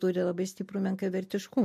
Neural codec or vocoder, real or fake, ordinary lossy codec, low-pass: none; real; MP3, 64 kbps; 14.4 kHz